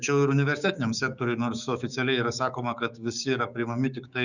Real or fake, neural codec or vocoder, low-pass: fake; codec, 16 kHz, 6 kbps, DAC; 7.2 kHz